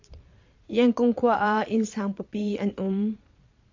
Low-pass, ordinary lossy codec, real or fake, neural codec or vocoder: 7.2 kHz; AAC, 48 kbps; fake; vocoder, 22.05 kHz, 80 mel bands, Vocos